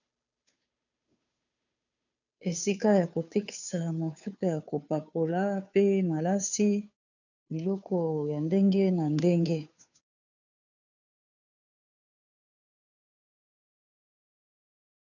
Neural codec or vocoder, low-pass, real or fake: codec, 16 kHz, 2 kbps, FunCodec, trained on Chinese and English, 25 frames a second; 7.2 kHz; fake